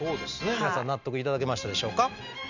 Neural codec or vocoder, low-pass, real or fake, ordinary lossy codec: none; 7.2 kHz; real; none